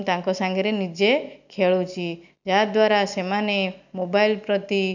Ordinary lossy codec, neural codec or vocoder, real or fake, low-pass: none; none; real; 7.2 kHz